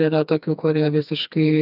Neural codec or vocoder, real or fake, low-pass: codec, 16 kHz, 2 kbps, FreqCodec, smaller model; fake; 5.4 kHz